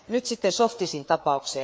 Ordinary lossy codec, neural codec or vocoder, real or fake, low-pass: none; codec, 16 kHz, 4 kbps, FreqCodec, larger model; fake; none